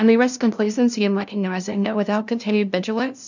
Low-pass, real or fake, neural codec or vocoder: 7.2 kHz; fake; codec, 16 kHz, 0.5 kbps, FunCodec, trained on LibriTTS, 25 frames a second